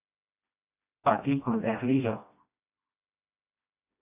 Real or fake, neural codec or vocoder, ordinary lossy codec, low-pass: fake; codec, 16 kHz, 1 kbps, FreqCodec, smaller model; AAC, 32 kbps; 3.6 kHz